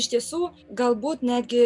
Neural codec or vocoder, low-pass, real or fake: none; 10.8 kHz; real